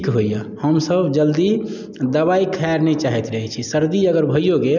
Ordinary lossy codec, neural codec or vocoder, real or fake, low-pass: none; none; real; 7.2 kHz